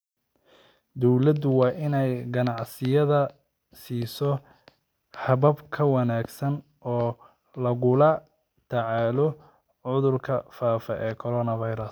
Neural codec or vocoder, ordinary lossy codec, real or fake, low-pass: none; none; real; none